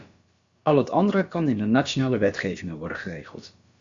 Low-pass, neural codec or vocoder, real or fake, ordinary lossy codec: 7.2 kHz; codec, 16 kHz, about 1 kbps, DyCAST, with the encoder's durations; fake; Opus, 64 kbps